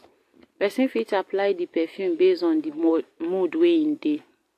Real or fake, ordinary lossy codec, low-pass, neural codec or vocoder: real; AAC, 64 kbps; 14.4 kHz; none